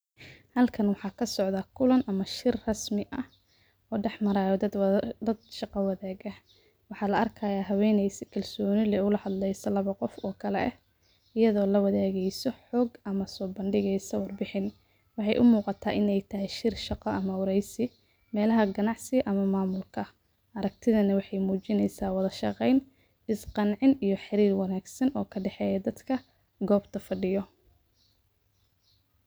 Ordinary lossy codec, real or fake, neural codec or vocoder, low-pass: none; real; none; none